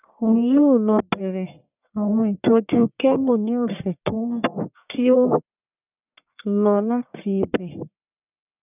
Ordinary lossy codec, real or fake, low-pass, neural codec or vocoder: none; fake; 3.6 kHz; codec, 44.1 kHz, 1.7 kbps, Pupu-Codec